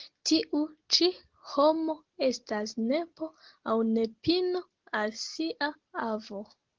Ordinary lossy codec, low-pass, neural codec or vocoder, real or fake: Opus, 16 kbps; 7.2 kHz; none; real